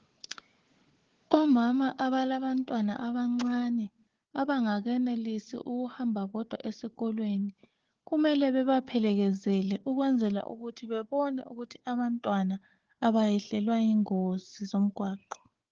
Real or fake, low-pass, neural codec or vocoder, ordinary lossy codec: fake; 7.2 kHz; codec, 16 kHz, 4 kbps, FunCodec, trained on Chinese and English, 50 frames a second; Opus, 16 kbps